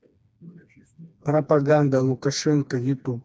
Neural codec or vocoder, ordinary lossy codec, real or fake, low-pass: codec, 16 kHz, 2 kbps, FreqCodec, smaller model; none; fake; none